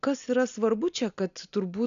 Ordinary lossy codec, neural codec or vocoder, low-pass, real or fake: MP3, 96 kbps; none; 7.2 kHz; real